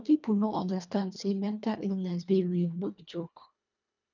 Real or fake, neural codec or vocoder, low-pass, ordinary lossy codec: fake; codec, 24 kHz, 1.5 kbps, HILCodec; 7.2 kHz; none